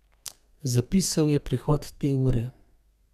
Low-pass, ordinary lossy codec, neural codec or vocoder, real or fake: 14.4 kHz; none; codec, 32 kHz, 1.9 kbps, SNAC; fake